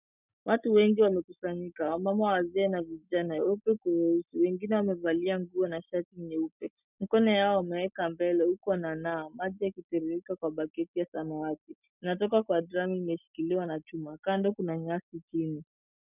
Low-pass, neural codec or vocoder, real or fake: 3.6 kHz; none; real